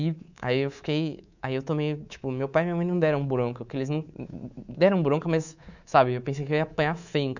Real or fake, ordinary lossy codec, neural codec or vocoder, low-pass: fake; none; codec, 24 kHz, 3.1 kbps, DualCodec; 7.2 kHz